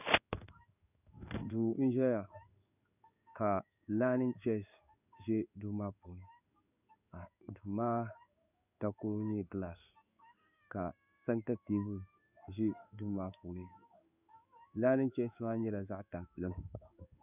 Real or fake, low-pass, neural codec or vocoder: fake; 3.6 kHz; codec, 16 kHz in and 24 kHz out, 1 kbps, XY-Tokenizer